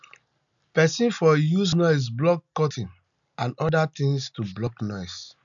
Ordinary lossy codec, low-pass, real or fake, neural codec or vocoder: none; 7.2 kHz; real; none